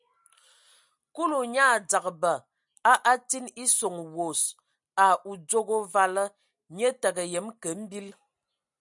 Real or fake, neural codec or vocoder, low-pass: real; none; 10.8 kHz